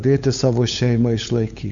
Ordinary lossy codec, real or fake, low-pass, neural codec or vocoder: Opus, 64 kbps; fake; 7.2 kHz; codec, 16 kHz, 8 kbps, FunCodec, trained on LibriTTS, 25 frames a second